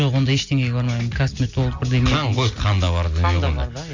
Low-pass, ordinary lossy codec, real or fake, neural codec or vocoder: 7.2 kHz; MP3, 48 kbps; real; none